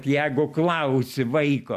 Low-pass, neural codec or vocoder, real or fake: 14.4 kHz; none; real